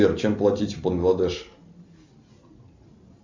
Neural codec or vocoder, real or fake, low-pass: none; real; 7.2 kHz